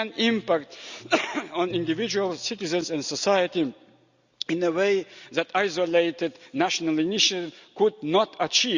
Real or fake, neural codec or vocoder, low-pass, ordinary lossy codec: real; none; 7.2 kHz; Opus, 64 kbps